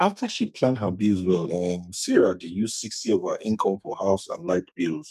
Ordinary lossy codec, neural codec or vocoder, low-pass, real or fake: none; codec, 32 kHz, 1.9 kbps, SNAC; 14.4 kHz; fake